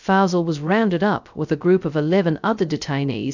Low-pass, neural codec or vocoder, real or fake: 7.2 kHz; codec, 16 kHz, 0.2 kbps, FocalCodec; fake